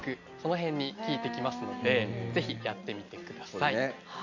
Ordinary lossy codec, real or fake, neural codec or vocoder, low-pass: none; real; none; 7.2 kHz